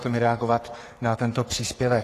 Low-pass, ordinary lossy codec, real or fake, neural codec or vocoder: 14.4 kHz; AAC, 48 kbps; fake; codec, 44.1 kHz, 3.4 kbps, Pupu-Codec